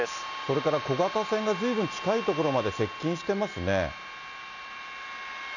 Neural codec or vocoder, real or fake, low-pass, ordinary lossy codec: none; real; 7.2 kHz; none